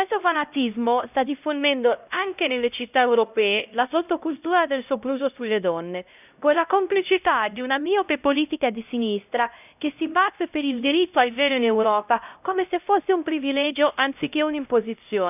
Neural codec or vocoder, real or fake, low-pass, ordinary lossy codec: codec, 16 kHz, 0.5 kbps, X-Codec, HuBERT features, trained on LibriSpeech; fake; 3.6 kHz; none